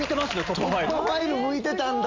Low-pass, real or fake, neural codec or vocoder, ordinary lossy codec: 7.2 kHz; real; none; Opus, 32 kbps